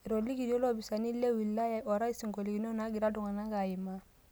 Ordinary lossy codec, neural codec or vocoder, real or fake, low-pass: none; none; real; none